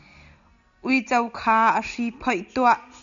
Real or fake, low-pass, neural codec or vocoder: real; 7.2 kHz; none